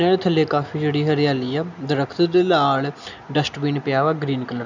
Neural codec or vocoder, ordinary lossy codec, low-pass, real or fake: none; none; 7.2 kHz; real